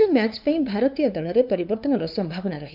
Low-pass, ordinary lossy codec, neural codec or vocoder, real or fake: 5.4 kHz; none; codec, 16 kHz, 2 kbps, FunCodec, trained on LibriTTS, 25 frames a second; fake